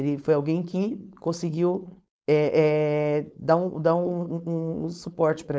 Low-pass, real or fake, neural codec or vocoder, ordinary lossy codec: none; fake; codec, 16 kHz, 4.8 kbps, FACodec; none